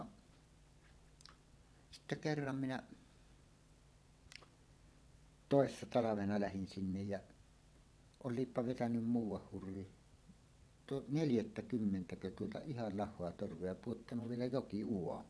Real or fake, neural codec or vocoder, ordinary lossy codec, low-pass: fake; vocoder, 22.05 kHz, 80 mel bands, WaveNeXt; none; none